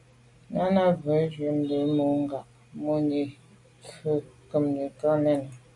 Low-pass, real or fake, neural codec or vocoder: 10.8 kHz; real; none